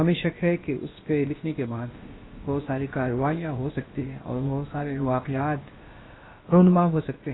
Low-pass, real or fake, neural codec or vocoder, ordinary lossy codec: 7.2 kHz; fake; codec, 16 kHz, about 1 kbps, DyCAST, with the encoder's durations; AAC, 16 kbps